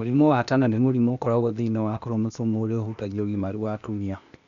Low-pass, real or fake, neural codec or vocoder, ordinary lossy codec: 7.2 kHz; fake; codec, 16 kHz, 0.8 kbps, ZipCodec; none